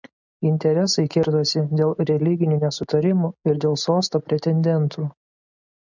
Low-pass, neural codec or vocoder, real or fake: 7.2 kHz; none; real